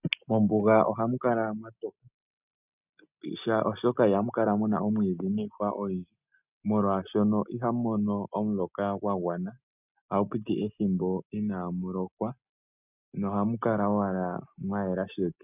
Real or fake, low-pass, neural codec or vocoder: real; 3.6 kHz; none